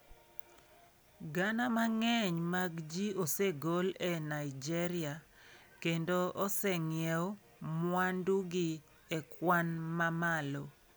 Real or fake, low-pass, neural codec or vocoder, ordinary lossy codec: real; none; none; none